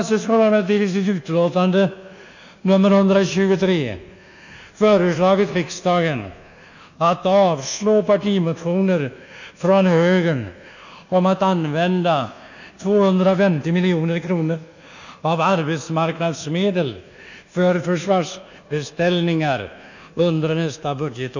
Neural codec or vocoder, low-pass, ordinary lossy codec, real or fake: codec, 24 kHz, 1.2 kbps, DualCodec; 7.2 kHz; none; fake